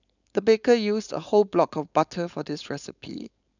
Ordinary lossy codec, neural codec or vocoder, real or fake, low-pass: none; codec, 16 kHz, 4.8 kbps, FACodec; fake; 7.2 kHz